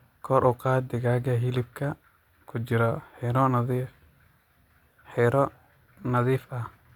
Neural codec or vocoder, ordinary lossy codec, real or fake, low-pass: none; none; real; 19.8 kHz